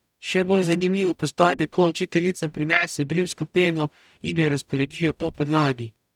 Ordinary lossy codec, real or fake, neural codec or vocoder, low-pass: none; fake; codec, 44.1 kHz, 0.9 kbps, DAC; 19.8 kHz